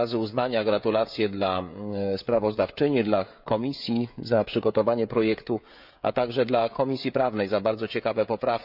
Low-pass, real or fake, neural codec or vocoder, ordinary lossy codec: 5.4 kHz; fake; codec, 16 kHz, 16 kbps, FreqCodec, smaller model; none